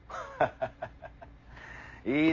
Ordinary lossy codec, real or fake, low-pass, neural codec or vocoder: none; real; 7.2 kHz; none